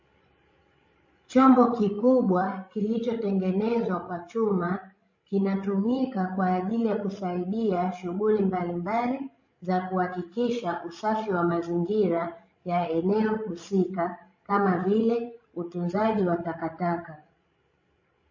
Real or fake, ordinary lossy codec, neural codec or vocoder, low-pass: fake; MP3, 32 kbps; codec, 16 kHz, 16 kbps, FreqCodec, larger model; 7.2 kHz